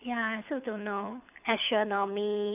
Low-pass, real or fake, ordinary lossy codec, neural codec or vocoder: 3.6 kHz; fake; none; codec, 24 kHz, 6 kbps, HILCodec